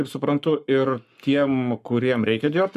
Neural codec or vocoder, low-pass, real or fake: codec, 44.1 kHz, 7.8 kbps, Pupu-Codec; 14.4 kHz; fake